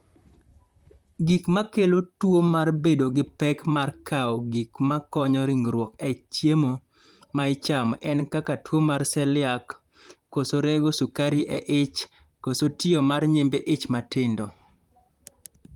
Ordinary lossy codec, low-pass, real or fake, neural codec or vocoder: Opus, 32 kbps; 19.8 kHz; fake; vocoder, 44.1 kHz, 128 mel bands, Pupu-Vocoder